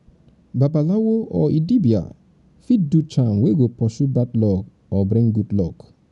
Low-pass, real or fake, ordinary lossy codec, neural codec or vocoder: 10.8 kHz; real; none; none